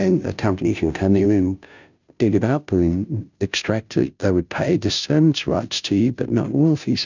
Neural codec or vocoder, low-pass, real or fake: codec, 16 kHz, 0.5 kbps, FunCodec, trained on Chinese and English, 25 frames a second; 7.2 kHz; fake